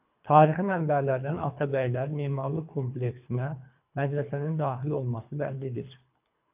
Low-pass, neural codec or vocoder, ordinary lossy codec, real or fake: 3.6 kHz; codec, 24 kHz, 3 kbps, HILCodec; AAC, 32 kbps; fake